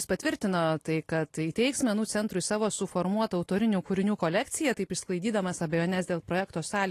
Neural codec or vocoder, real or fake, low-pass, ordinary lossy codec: none; real; 14.4 kHz; AAC, 48 kbps